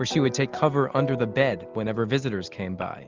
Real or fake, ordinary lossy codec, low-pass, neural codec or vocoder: real; Opus, 24 kbps; 7.2 kHz; none